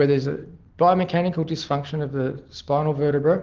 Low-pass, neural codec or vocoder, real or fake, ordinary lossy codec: 7.2 kHz; none; real; Opus, 16 kbps